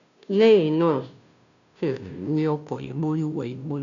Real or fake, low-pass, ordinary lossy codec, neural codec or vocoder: fake; 7.2 kHz; none; codec, 16 kHz, 0.5 kbps, FunCodec, trained on Chinese and English, 25 frames a second